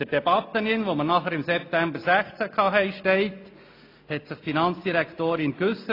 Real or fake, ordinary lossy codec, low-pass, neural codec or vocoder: real; AAC, 32 kbps; 5.4 kHz; none